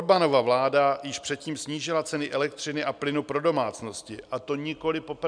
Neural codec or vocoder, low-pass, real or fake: none; 9.9 kHz; real